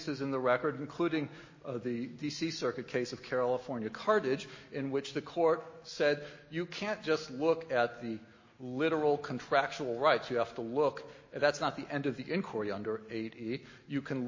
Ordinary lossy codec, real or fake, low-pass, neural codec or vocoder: MP3, 32 kbps; real; 7.2 kHz; none